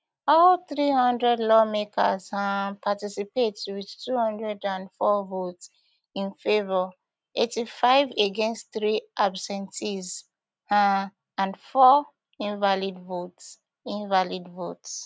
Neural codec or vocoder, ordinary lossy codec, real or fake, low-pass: none; none; real; none